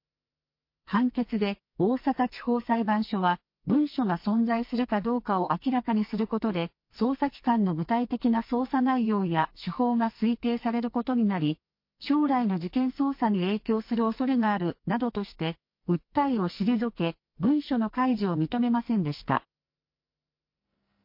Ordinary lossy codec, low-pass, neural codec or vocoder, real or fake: MP3, 32 kbps; 5.4 kHz; codec, 44.1 kHz, 2.6 kbps, SNAC; fake